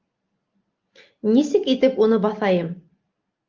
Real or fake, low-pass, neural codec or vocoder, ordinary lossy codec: real; 7.2 kHz; none; Opus, 24 kbps